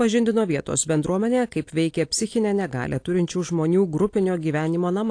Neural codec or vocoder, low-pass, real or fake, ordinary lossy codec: none; 9.9 kHz; real; AAC, 48 kbps